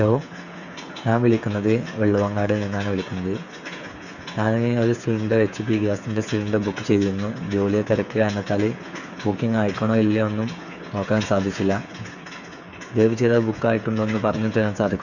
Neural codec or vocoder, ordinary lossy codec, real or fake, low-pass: codec, 16 kHz, 8 kbps, FreqCodec, smaller model; none; fake; 7.2 kHz